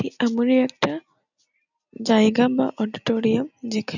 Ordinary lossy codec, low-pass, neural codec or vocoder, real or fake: none; 7.2 kHz; none; real